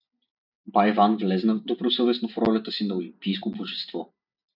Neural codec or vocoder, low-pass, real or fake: none; 5.4 kHz; real